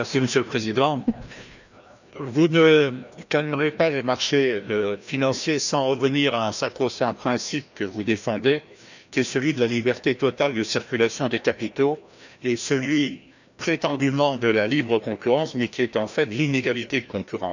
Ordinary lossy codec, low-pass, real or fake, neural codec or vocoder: none; 7.2 kHz; fake; codec, 16 kHz, 1 kbps, FreqCodec, larger model